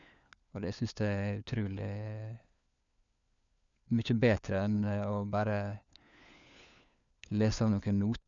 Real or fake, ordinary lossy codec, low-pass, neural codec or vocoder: fake; none; 7.2 kHz; codec, 16 kHz, 4 kbps, FunCodec, trained on LibriTTS, 50 frames a second